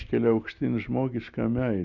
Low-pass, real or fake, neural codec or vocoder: 7.2 kHz; real; none